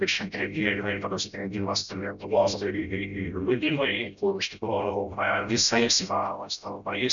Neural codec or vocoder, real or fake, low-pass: codec, 16 kHz, 0.5 kbps, FreqCodec, smaller model; fake; 7.2 kHz